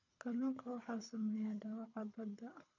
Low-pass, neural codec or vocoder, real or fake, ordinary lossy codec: 7.2 kHz; codec, 24 kHz, 6 kbps, HILCodec; fake; none